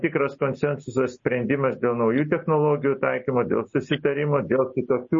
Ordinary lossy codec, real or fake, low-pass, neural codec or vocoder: MP3, 32 kbps; real; 7.2 kHz; none